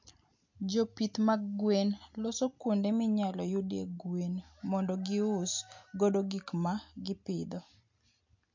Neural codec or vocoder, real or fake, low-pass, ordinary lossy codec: none; real; 7.2 kHz; MP3, 48 kbps